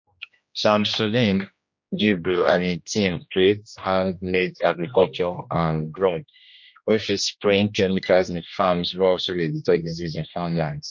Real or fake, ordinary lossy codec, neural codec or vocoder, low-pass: fake; MP3, 48 kbps; codec, 16 kHz, 1 kbps, X-Codec, HuBERT features, trained on general audio; 7.2 kHz